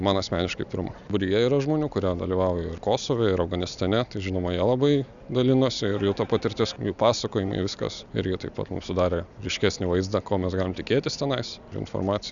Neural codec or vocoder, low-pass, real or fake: none; 7.2 kHz; real